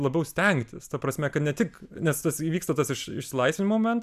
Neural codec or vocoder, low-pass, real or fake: none; 14.4 kHz; real